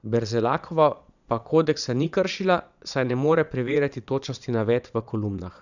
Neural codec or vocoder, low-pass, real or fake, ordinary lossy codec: vocoder, 22.05 kHz, 80 mel bands, Vocos; 7.2 kHz; fake; none